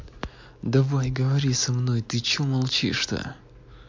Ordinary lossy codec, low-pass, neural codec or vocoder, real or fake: MP3, 48 kbps; 7.2 kHz; none; real